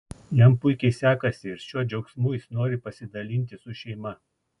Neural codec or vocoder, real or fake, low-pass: none; real; 10.8 kHz